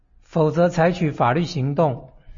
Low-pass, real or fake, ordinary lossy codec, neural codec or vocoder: 7.2 kHz; real; MP3, 32 kbps; none